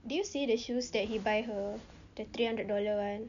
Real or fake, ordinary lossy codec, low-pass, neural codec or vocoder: real; none; 7.2 kHz; none